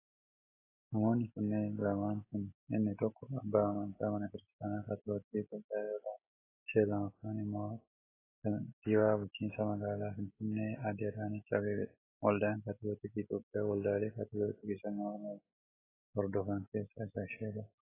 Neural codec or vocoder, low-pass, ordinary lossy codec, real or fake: none; 3.6 kHz; AAC, 16 kbps; real